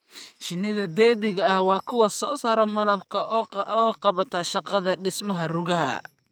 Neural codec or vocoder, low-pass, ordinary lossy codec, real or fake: codec, 44.1 kHz, 2.6 kbps, SNAC; none; none; fake